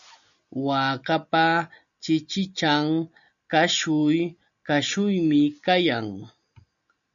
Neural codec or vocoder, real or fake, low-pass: none; real; 7.2 kHz